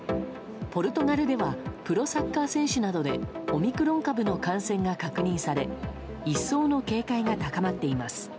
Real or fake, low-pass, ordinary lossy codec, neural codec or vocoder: real; none; none; none